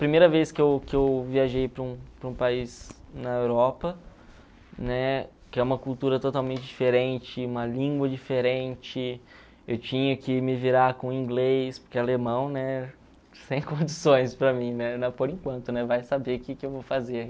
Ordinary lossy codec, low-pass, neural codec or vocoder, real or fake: none; none; none; real